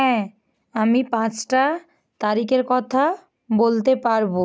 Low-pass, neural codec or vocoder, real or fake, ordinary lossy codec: none; none; real; none